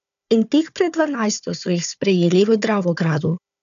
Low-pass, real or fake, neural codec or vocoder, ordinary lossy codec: 7.2 kHz; fake; codec, 16 kHz, 4 kbps, FunCodec, trained on Chinese and English, 50 frames a second; none